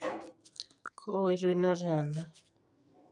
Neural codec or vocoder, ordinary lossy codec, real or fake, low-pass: codec, 44.1 kHz, 2.6 kbps, SNAC; none; fake; 10.8 kHz